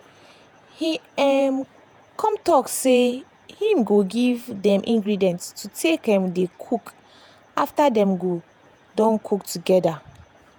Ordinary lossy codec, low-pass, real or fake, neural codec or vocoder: none; none; fake; vocoder, 48 kHz, 128 mel bands, Vocos